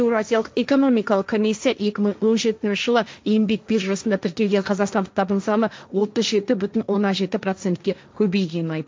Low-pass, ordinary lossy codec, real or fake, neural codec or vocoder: none; none; fake; codec, 16 kHz, 1.1 kbps, Voila-Tokenizer